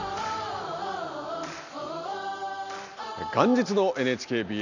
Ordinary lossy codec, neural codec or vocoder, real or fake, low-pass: none; none; real; 7.2 kHz